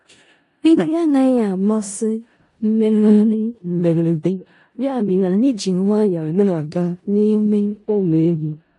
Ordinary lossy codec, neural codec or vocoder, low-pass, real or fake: AAC, 48 kbps; codec, 16 kHz in and 24 kHz out, 0.4 kbps, LongCat-Audio-Codec, four codebook decoder; 10.8 kHz; fake